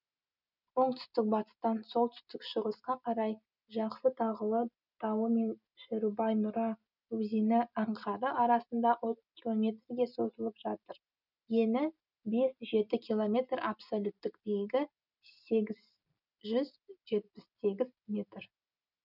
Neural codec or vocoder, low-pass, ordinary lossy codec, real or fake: none; 5.4 kHz; none; real